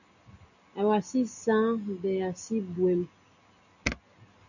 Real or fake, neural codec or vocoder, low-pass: real; none; 7.2 kHz